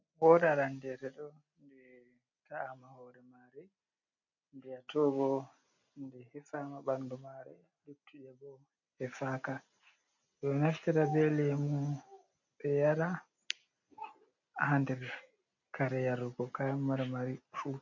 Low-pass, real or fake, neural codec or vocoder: 7.2 kHz; real; none